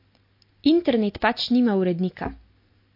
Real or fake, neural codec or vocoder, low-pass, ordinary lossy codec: real; none; 5.4 kHz; MP3, 32 kbps